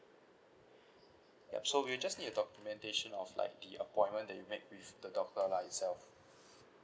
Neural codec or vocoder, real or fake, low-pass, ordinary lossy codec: none; real; none; none